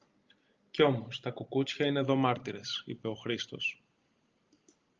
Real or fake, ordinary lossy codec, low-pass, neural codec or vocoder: real; Opus, 24 kbps; 7.2 kHz; none